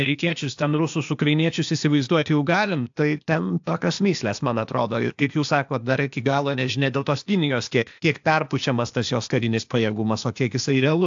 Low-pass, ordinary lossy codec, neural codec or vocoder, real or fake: 7.2 kHz; AAC, 64 kbps; codec, 16 kHz, 0.8 kbps, ZipCodec; fake